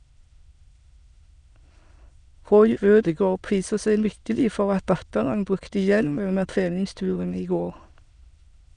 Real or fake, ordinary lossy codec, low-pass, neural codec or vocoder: fake; none; 9.9 kHz; autoencoder, 22.05 kHz, a latent of 192 numbers a frame, VITS, trained on many speakers